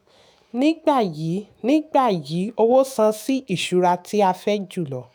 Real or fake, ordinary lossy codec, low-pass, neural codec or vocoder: fake; none; none; autoencoder, 48 kHz, 128 numbers a frame, DAC-VAE, trained on Japanese speech